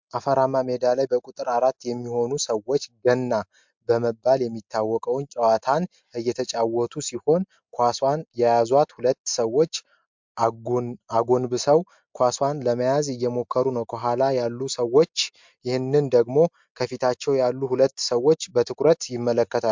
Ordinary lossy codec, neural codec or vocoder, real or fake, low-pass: MP3, 64 kbps; none; real; 7.2 kHz